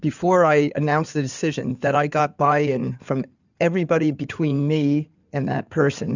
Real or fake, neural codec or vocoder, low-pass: fake; codec, 16 kHz in and 24 kHz out, 2.2 kbps, FireRedTTS-2 codec; 7.2 kHz